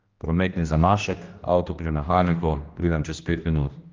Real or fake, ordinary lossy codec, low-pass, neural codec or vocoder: fake; Opus, 24 kbps; 7.2 kHz; codec, 16 kHz, 1 kbps, X-Codec, HuBERT features, trained on general audio